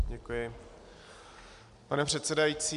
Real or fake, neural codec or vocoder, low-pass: real; none; 10.8 kHz